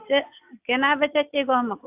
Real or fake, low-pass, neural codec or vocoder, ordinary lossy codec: real; 3.6 kHz; none; AAC, 32 kbps